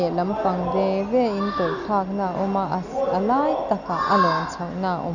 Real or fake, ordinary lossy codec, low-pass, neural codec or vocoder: real; none; 7.2 kHz; none